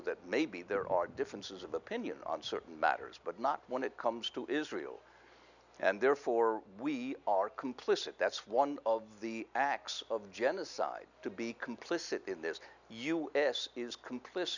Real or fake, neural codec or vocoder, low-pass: real; none; 7.2 kHz